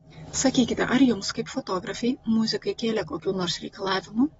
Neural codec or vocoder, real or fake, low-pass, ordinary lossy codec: none; real; 10.8 kHz; AAC, 24 kbps